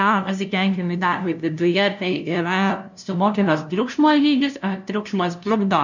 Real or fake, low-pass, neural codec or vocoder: fake; 7.2 kHz; codec, 16 kHz, 0.5 kbps, FunCodec, trained on LibriTTS, 25 frames a second